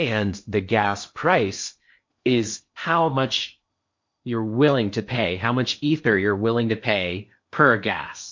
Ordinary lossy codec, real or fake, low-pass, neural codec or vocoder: MP3, 48 kbps; fake; 7.2 kHz; codec, 16 kHz in and 24 kHz out, 0.8 kbps, FocalCodec, streaming, 65536 codes